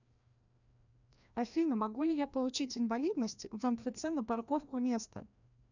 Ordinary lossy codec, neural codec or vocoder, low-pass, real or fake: none; codec, 16 kHz, 1 kbps, FreqCodec, larger model; 7.2 kHz; fake